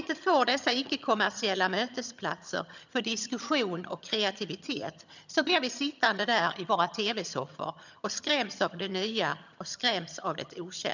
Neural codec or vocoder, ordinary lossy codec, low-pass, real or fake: vocoder, 22.05 kHz, 80 mel bands, HiFi-GAN; none; 7.2 kHz; fake